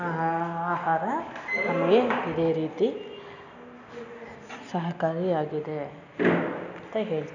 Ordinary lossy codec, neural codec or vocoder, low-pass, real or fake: none; none; 7.2 kHz; real